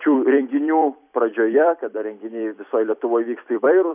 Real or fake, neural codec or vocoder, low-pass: fake; vocoder, 44.1 kHz, 128 mel bands every 256 samples, BigVGAN v2; 3.6 kHz